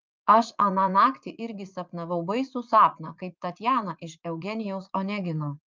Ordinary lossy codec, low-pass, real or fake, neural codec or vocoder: Opus, 24 kbps; 7.2 kHz; fake; vocoder, 44.1 kHz, 80 mel bands, Vocos